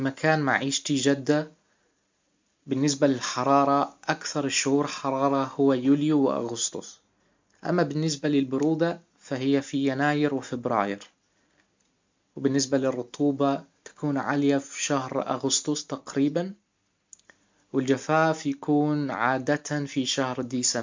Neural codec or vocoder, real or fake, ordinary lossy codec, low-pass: none; real; MP3, 64 kbps; 7.2 kHz